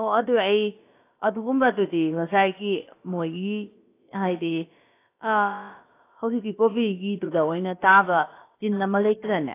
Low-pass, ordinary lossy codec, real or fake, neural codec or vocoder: 3.6 kHz; AAC, 24 kbps; fake; codec, 16 kHz, about 1 kbps, DyCAST, with the encoder's durations